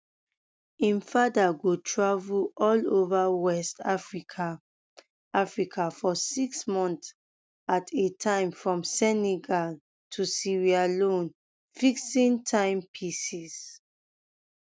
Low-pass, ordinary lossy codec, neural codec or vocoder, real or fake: none; none; none; real